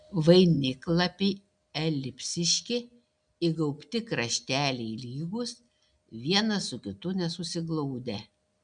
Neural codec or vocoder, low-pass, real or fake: none; 9.9 kHz; real